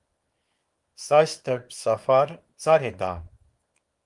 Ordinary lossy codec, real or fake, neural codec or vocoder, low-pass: Opus, 32 kbps; fake; codec, 24 kHz, 0.9 kbps, WavTokenizer, small release; 10.8 kHz